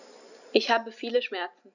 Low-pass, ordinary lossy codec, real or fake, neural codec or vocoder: 7.2 kHz; none; real; none